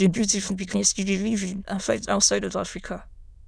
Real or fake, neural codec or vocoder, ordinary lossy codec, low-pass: fake; autoencoder, 22.05 kHz, a latent of 192 numbers a frame, VITS, trained on many speakers; none; none